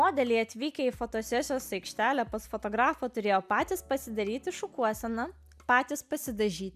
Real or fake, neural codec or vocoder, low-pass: real; none; 14.4 kHz